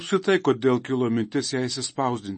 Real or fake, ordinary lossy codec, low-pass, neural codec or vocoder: real; MP3, 32 kbps; 10.8 kHz; none